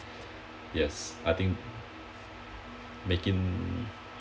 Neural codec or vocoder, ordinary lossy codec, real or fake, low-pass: none; none; real; none